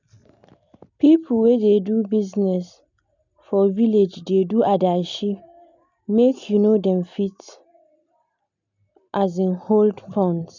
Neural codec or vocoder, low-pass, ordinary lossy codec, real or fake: none; 7.2 kHz; none; real